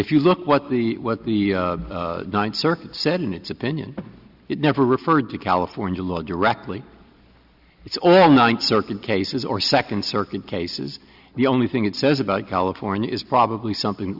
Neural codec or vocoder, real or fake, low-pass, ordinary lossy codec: none; real; 5.4 kHz; Opus, 64 kbps